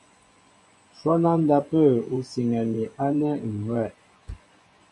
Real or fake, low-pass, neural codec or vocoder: fake; 10.8 kHz; vocoder, 24 kHz, 100 mel bands, Vocos